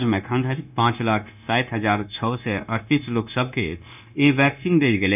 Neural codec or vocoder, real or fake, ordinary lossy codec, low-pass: codec, 16 kHz, 0.9 kbps, LongCat-Audio-Codec; fake; none; 3.6 kHz